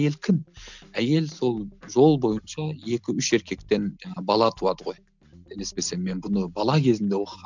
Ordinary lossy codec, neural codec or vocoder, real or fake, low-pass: none; none; real; 7.2 kHz